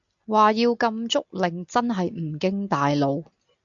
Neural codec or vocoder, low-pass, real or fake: none; 7.2 kHz; real